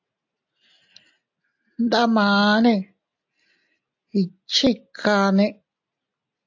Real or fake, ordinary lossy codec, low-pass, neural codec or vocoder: real; AAC, 48 kbps; 7.2 kHz; none